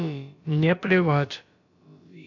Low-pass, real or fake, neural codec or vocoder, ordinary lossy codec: 7.2 kHz; fake; codec, 16 kHz, about 1 kbps, DyCAST, with the encoder's durations; none